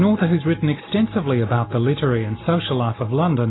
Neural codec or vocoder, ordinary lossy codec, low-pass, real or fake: none; AAC, 16 kbps; 7.2 kHz; real